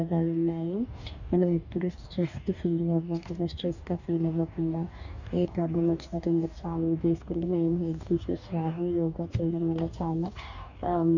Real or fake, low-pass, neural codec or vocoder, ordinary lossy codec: fake; 7.2 kHz; codec, 44.1 kHz, 2.6 kbps, DAC; none